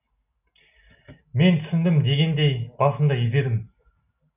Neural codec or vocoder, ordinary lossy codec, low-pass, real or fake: none; none; 3.6 kHz; real